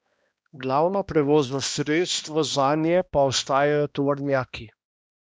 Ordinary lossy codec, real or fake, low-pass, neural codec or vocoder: none; fake; none; codec, 16 kHz, 1 kbps, X-Codec, HuBERT features, trained on balanced general audio